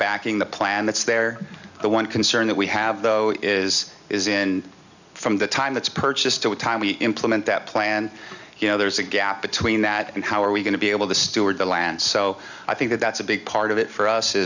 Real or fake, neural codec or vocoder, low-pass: real; none; 7.2 kHz